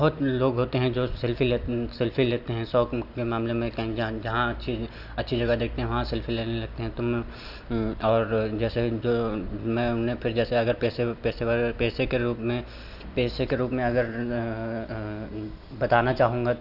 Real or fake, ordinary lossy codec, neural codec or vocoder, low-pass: real; none; none; 5.4 kHz